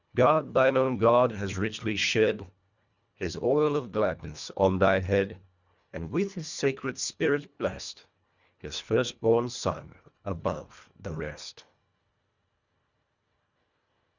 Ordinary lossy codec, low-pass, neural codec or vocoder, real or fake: Opus, 64 kbps; 7.2 kHz; codec, 24 kHz, 1.5 kbps, HILCodec; fake